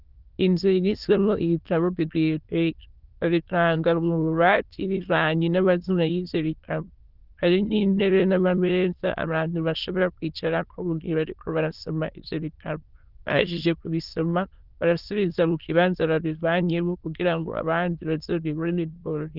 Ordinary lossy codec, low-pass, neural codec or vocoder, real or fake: Opus, 32 kbps; 5.4 kHz; autoencoder, 22.05 kHz, a latent of 192 numbers a frame, VITS, trained on many speakers; fake